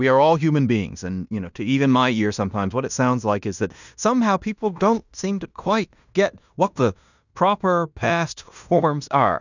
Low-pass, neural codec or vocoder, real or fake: 7.2 kHz; codec, 16 kHz in and 24 kHz out, 0.9 kbps, LongCat-Audio-Codec, fine tuned four codebook decoder; fake